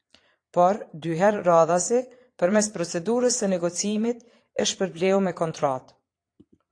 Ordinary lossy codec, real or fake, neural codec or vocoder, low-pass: AAC, 48 kbps; fake; vocoder, 22.05 kHz, 80 mel bands, Vocos; 9.9 kHz